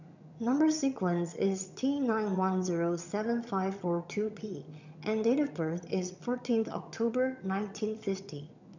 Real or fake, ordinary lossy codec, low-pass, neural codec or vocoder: fake; none; 7.2 kHz; vocoder, 22.05 kHz, 80 mel bands, HiFi-GAN